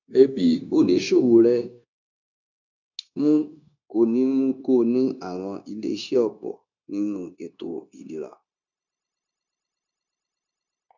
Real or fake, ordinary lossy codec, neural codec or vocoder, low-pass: fake; none; codec, 16 kHz, 0.9 kbps, LongCat-Audio-Codec; 7.2 kHz